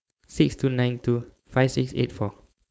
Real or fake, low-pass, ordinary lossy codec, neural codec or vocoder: fake; none; none; codec, 16 kHz, 4.8 kbps, FACodec